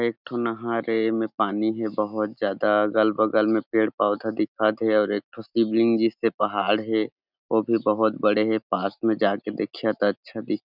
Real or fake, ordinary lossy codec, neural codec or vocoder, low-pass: real; none; none; 5.4 kHz